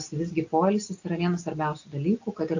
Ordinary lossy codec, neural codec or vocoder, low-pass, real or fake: AAC, 48 kbps; none; 7.2 kHz; real